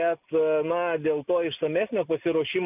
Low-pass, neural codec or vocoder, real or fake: 3.6 kHz; none; real